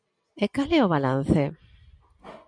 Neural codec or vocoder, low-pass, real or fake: none; 9.9 kHz; real